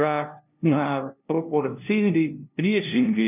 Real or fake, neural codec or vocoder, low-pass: fake; codec, 16 kHz, 0.5 kbps, FunCodec, trained on LibriTTS, 25 frames a second; 3.6 kHz